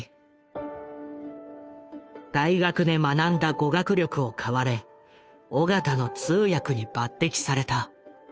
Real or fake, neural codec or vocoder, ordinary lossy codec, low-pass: fake; codec, 16 kHz, 2 kbps, FunCodec, trained on Chinese and English, 25 frames a second; none; none